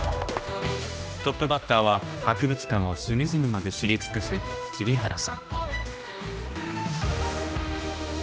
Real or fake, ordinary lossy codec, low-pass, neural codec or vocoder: fake; none; none; codec, 16 kHz, 1 kbps, X-Codec, HuBERT features, trained on general audio